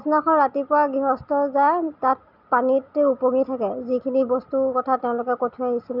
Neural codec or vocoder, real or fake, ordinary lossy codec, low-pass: none; real; none; 5.4 kHz